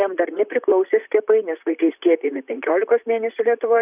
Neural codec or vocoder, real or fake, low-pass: vocoder, 44.1 kHz, 128 mel bands, Pupu-Vocoder; fake; 3.6 kHz